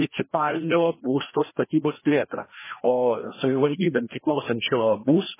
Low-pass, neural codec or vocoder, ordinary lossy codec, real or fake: 3.6 kHz; codec, 16 kHz, 1 kbps, FreqCodec, larger model; MP3, 16 kbps; fake